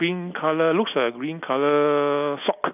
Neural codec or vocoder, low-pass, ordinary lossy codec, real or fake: none; 3.6 kHz; none; real